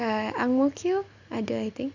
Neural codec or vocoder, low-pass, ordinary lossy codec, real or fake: none; 7.2 kHz; none; real